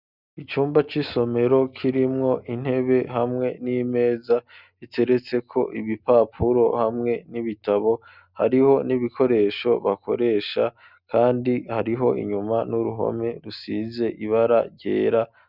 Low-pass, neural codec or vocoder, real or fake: 5.4 kHz; none; real